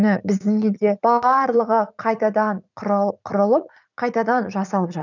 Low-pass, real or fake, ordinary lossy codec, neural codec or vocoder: 7.2 kHz; fake; none; vocoder, 44.1 kHz, 80 mel bands, Vocos